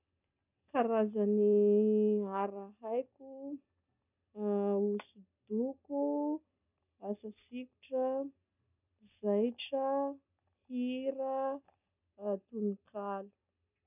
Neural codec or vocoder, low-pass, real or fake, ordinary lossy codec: none; 3.6 kHz; real; none